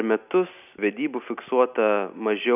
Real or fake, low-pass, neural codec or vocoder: real; 3.6 kHz; none